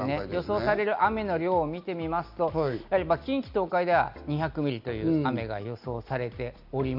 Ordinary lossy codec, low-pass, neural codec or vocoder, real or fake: none; 5.4 kHz; none; real